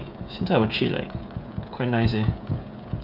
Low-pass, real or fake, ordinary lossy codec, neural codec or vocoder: 5.4 kHz; fake; none; codec, 16 kHz, 16 kbps, FreqCodec, smaller model